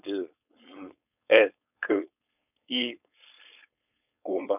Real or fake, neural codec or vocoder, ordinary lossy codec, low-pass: fake; codec, 16 kHz, 4.8 kbps, FACodec; none; 3.6 kHz